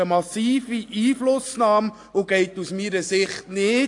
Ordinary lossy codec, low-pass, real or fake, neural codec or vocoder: AAC, 48 kbps; 10.8 kHz; real; none